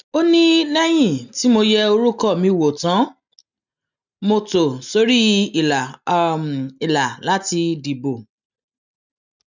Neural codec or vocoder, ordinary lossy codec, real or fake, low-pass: none; none; real; 7.2 kHz